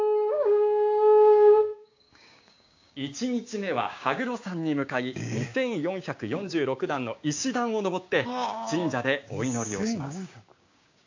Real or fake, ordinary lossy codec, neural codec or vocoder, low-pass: fake; AAC, 48 kbps; codec, 16 kHz, 6 kbps, DAC; 7.2 kHz